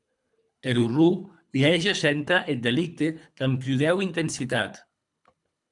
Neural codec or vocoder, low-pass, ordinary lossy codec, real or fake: codec, 24 kHz, 3 kbps, HILCodec; 10.8 kHz; MP3, 96 kbps; fake